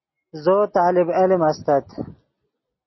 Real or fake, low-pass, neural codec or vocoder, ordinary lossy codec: real; 7.2 kHz; none; MP3, 24 kbps